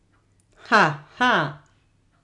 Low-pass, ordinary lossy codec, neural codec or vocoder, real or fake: 10.8 kHz; none; none; real